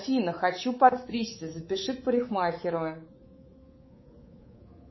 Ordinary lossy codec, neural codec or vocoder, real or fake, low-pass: MP3, 24 kbps; codec, 24 kHz, 3.1 kbps, DualCodec; fake; 7.2 kHz